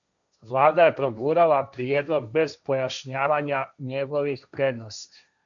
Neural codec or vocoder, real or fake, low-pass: codec, 16 kHz, 1.1 kbps, Voila-Tokenizer; fake; 7.2 kHz